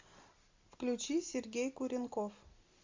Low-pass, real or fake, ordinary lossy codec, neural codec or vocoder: 7.2 kHz; real; MP3, 64 kbps; none